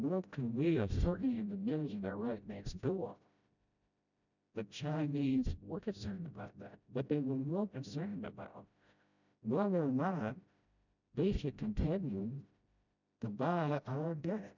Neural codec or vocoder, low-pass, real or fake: codec, 16 kHz, 0.5 kbps, FreqCodec, smaller model; 7.2 kHz; fake